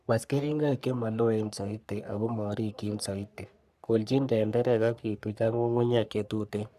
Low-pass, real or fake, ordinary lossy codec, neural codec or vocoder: 14.4 kHz; fake; none; codec, 44.1 kHz, 3.4 kbps, Pupu-Codec